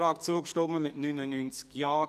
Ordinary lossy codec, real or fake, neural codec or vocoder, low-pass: none; fake; codec, 44.1 kHz, 2.6 kbps, SNAC; 14.4 kHz